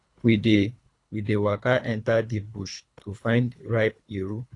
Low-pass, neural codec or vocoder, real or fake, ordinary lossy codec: 10.8 kHz; codec, 24 kHz, 3 kbps, HILCodec; fake; AAC, 48 kbps